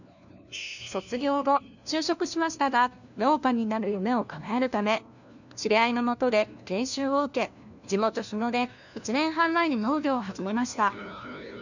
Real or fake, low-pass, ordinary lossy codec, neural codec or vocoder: fake; 7.2 kHz; none; codec, 16 kHz, 1 kbps, FunCodec, trained on LibriTTS, 50 frames a second